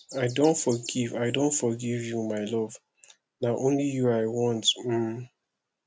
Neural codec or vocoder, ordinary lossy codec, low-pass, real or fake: none; none; none; real